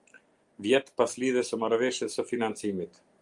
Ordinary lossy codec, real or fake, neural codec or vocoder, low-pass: Opus, 32 kbps; real; none; 10.8 kHz